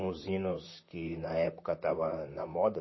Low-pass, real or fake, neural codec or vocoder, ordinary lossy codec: 7.2 kHz; fake; vocoder, 44.1 kHz, 128 mel bands, Pupu-Vocoder; MP3, 24 kbps